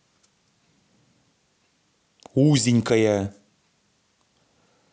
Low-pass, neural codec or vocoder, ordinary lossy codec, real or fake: none; none; none; real